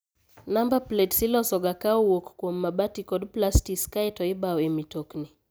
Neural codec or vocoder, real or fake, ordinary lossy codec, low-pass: none; real; none; none